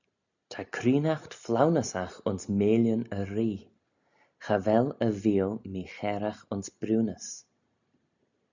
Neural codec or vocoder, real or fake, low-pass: none; real; 7.2 kHz